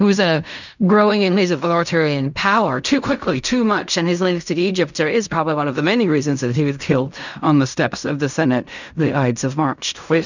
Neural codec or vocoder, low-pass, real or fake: codec, 16 kHz in and 24 kHz out, 0.4 kbps, LongCat-Audio-Codec, fine tuned four codebook decoder; 7.2 kHz; fake